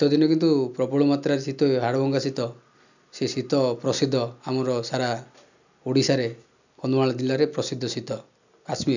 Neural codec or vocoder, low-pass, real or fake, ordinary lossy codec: none; 7.2 kHz; real; none